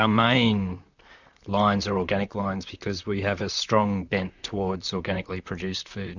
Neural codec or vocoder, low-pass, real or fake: vocoder, 44.1 kHz, 128 mel bands, Pupu-Vocoder; 7.2 kHz; fake